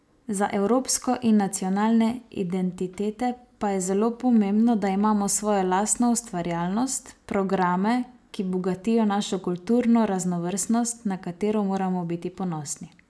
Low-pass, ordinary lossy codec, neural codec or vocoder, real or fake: none; none; none; real